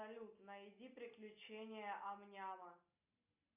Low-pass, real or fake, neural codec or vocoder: 3.6 kHz; real; none